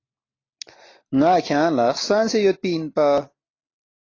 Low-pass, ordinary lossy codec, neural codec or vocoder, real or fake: 7.2 kHz; AAC, 32 kbps; none; real